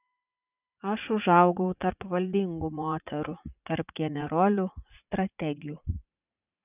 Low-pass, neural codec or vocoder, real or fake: 3.6 kHz; vocoder, 44.1 kHz, 80 mel bands, Vocos; fake